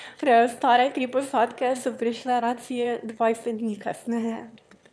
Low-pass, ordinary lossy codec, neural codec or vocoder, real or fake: none; none; autoencoder, 22.05 kHz, a latent of 192 numbers a frame, VITS, trained on one speaker; fake